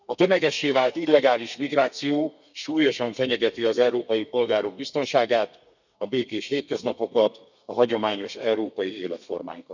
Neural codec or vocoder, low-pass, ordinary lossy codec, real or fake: codec, 32 kHz, 1.9 kbps, SNAC; 7.2 kHz; none; fake